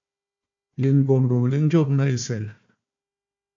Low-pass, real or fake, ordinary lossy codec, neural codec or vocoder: 7.2 kHz; fake; AAC, 64 kbps; codec, 16 kHz, 1 kbps, FunCodec, trained on Chinese and English, 50 frames a second